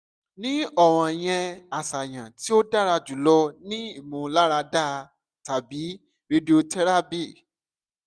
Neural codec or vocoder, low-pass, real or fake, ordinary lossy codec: none; none; real; none